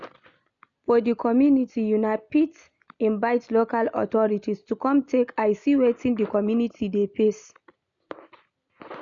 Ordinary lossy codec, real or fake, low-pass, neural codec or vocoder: none; real; 7.2 kHz; none